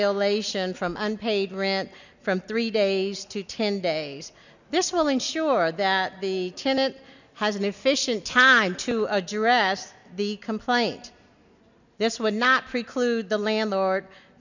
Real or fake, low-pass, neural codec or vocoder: real; 7.2 kHz; none